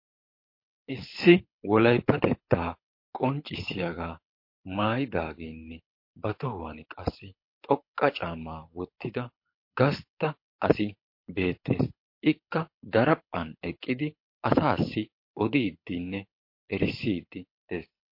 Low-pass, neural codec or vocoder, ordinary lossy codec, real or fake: 5.4 kHz; codec, 24 kHz, 6 kbps, HILCodec; MP3, 32 kbps; fake